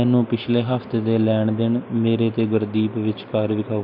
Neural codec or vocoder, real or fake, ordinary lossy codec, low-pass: none; real; none; 5.4 kHz